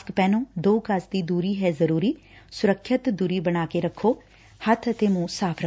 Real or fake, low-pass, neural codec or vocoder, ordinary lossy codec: real; none; none; none